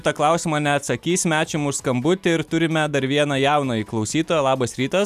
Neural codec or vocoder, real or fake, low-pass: none; real; 14.4 kHz